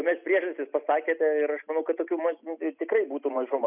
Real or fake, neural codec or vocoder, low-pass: real; none; 3.6 kHz